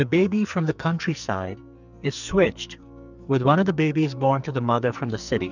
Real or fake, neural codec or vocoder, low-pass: fake; codec, 44.1 kHz, 2.6 kbps, SNAC; 7.2 kHz